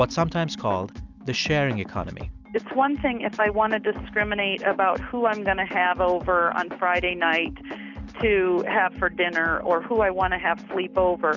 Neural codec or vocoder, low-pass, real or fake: none; 7.2 kHz; real